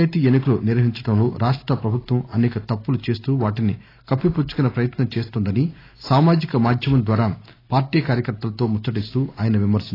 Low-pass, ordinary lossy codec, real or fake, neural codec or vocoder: 5.4 kHz; AAC, 24 kbps; real; none